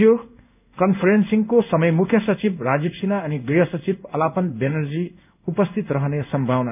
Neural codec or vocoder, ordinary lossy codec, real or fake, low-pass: codec, 16 kHz in and 24 kHz out, 1 kbps, XY-Tokenizer; none; fake; 3.6 kHz